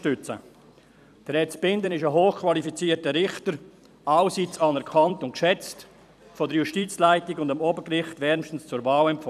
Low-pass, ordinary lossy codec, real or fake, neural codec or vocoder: 14.4 kHz; none; fake; vocoder, 44.1 kHz, 128 mel bands every 256 samples, BigVGAN v2